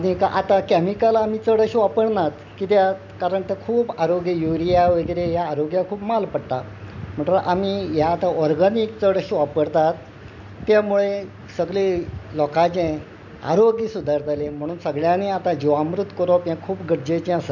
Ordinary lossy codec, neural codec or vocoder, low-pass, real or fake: none; none; 7.2 kHz; real